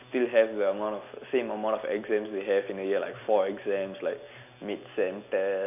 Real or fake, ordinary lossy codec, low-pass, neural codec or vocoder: real; none; 3.6 kHz; none